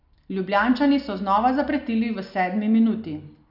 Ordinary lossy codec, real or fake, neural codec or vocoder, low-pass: none; real; none; 5.4 kHz